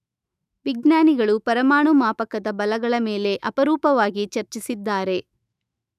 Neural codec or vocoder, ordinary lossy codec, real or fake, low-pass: autoencoder, 48 kHz, 128 numbers a frame, DAC-VAE, trained on Japanese speech; none; fake; 14.4 kHz